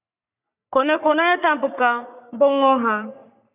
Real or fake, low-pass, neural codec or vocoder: fake; 3.6 kHz; codec, 44.1 kHz, 3.4 kbps, Pupu-Codec